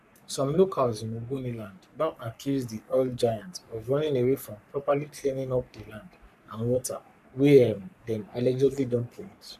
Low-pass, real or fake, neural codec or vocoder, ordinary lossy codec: 14.4 kHz; fake; codec, 44.1 kHz, 3.4 kbps, Pupu-Codec; none